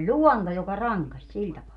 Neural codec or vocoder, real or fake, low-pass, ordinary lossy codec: none; real; 10.8 kHz; none